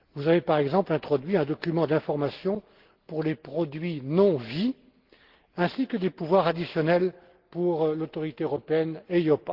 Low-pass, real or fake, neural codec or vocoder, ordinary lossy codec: 5.4 kHz; real; none; Opus, 16 kbps